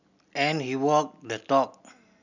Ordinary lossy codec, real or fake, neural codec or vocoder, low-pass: none; real; none; 7.2 kHz